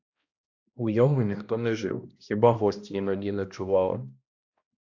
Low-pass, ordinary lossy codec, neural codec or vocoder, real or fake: 7.2 kHz; AAC, 48 kbps; codec, 16 kHz, 1 kbps, X-Codec, HuBERT features, trained on balanced general audio; fake